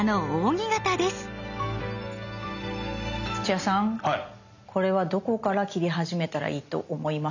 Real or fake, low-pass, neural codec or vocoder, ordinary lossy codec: real; 7.2 kHz; none; none